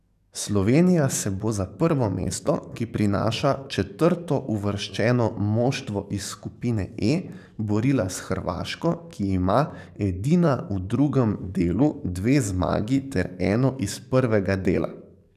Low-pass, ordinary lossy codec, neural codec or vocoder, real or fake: 14.4 kHz; none; codec, 44.1 kHz, 7.8 kbps, DAC; fake